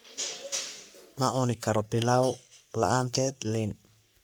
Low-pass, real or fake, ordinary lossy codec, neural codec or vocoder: none; fake; none; codec, 44.1 kHz, 3.4 kbps, Pupu-Codec